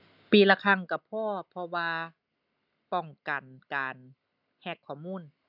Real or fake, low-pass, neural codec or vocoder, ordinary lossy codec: real; 5.4 kHz; none; none